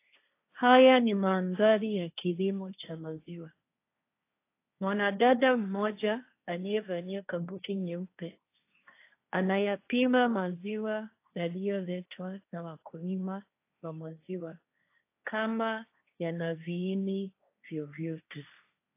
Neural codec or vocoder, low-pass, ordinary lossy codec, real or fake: codec, 16 kHz, 1.1 kbps, Voila-Tokenizer; 3.6 kHz; AAC, 24 kbps; fake